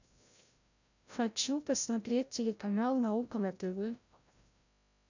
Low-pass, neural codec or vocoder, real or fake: 7.2 kHz; codec, 16 kHz, 0.5 kbps, FreqCodec, larger model; fake